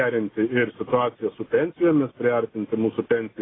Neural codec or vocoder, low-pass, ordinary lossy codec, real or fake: none; 7.2 kHz; AAC, 16 kbps; real